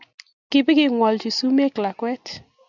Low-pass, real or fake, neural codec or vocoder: 7.2 kHz; real; none